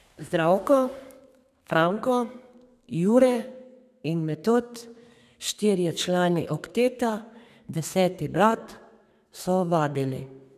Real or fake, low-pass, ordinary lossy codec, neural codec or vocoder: fake; 14.4 kHz; none; codec, 32 kHz, 1.9 kbps, SNAC